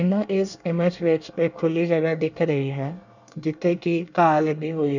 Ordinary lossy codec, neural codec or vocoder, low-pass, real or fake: none; codec, 24 kHz, 1 kbps, SNAC; 7.2 kHz; fake